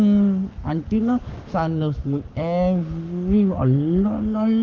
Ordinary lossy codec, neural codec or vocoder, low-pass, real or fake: Opus, 32 kbps; codec, 44.1 kHz, 7.8 kbps, Pupu-Codec; 7.2 kHz; fake